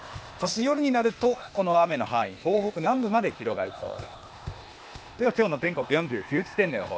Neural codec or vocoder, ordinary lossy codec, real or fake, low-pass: codec, 16 kHz, 0.8 kbps, ZipCodec; none; fake; none